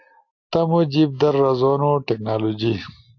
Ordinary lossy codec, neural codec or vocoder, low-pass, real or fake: AAC, 48 kbps; none; 7.2 kHz; real